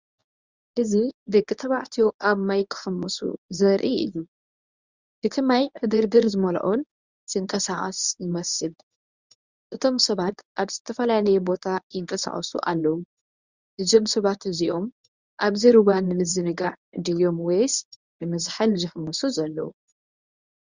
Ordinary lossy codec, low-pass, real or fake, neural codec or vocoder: Opus, 64 kbps; 7.2 kHz; fake; codec, 24 kHz, 0.9 kbps, WavTokenizer, medium speech release version 1